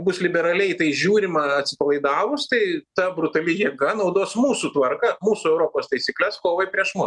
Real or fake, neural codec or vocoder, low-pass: real; none; 10.8 kHz